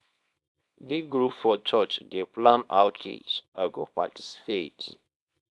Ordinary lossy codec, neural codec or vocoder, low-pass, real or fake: none; codec, 24 kHz, 0.9 kbps, WavTokenizer, small release; none; fake